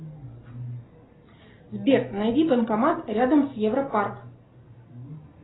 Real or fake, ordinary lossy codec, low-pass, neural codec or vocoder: real; AAC, 16 kbps; 7.2 kHz; none